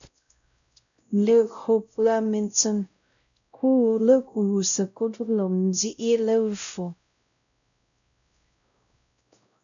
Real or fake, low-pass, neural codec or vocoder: fake; 7.2 kHz; codec, 16 kHz, 0.5 kbps, X-Codec, WavLM features, trained on Multilingual LibriSpeech